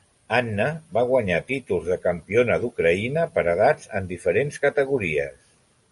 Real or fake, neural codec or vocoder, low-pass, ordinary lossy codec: real; none; 14.4 kHz; MP3, 48 kbps